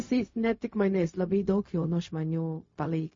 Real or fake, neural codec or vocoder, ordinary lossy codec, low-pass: fake; codec, 16 kHz, 0.4 kbps, LongCat-Audio-Codec; MP3, 32 kbps; 7.2 kHz